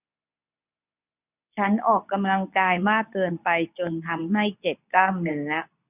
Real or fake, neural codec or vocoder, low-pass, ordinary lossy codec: fake; codec, 24 kHz, 0.9 kbps, WavTokenizer, medium speech release version 2; 3.6 kHz; none